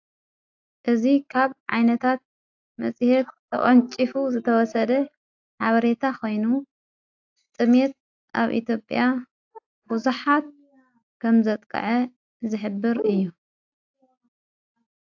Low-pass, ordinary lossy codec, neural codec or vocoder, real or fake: 7.2 kHz; AAC, 48 kbps; none; real